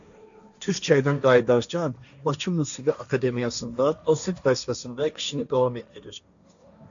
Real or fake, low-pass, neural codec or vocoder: fake; 7.2 kHz; codec, 16 kHz, 1.1 kbps, Voila-Tokenizer